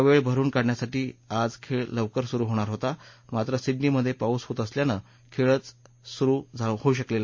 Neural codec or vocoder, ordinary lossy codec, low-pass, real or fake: none; MP3, 32 kbps; 7.2 kHz; real